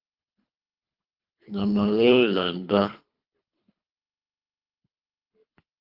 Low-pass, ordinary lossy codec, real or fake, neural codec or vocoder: 5.4 kHz; Opus, 32 kbps; fake; codec, 24 kHz, 3 kbps, HILCodec